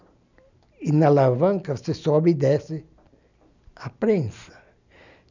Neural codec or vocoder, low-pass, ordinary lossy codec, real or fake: none; 7.2 kHz; none; real